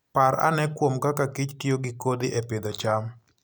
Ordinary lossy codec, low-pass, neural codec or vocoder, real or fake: none; none; none; real